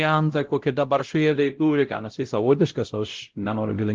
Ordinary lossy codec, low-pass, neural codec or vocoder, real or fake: Opus, 16 kbps; 7.2 kHz; codec, 16 kHz, 0.5 kbps, X-Codec, WavLM features, trained on Multilingual LibriSpeech; fake